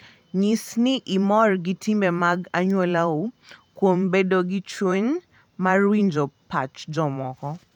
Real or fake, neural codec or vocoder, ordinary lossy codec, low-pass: fake; vocoder, 44.1 kHz, 128 mel bands every 512 samples, BigVGAN v2; none; 19.8 kHz